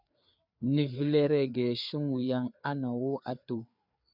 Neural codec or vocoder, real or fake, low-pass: codec, 16 kHz, 4 kbps, FreqCodec, larger model; fake; 5.4 kHz